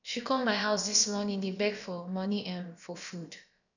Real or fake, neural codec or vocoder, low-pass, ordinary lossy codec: fake; codec, 16 kHz, about 1 kbps, DyCAST, with the encoder's durations; 7.2 kHz; none